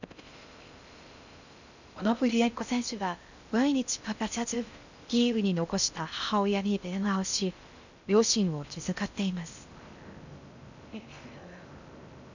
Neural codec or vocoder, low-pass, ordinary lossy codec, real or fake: codec, 16 kHz in and 24 kHz out, 0.6 kbps, FocalCodec, streaming, 4096 codes; 7.2 kHz; none; fake